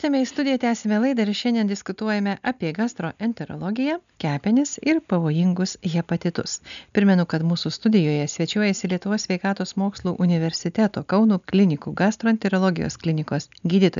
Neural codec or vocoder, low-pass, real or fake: none; 7.2 kHz; real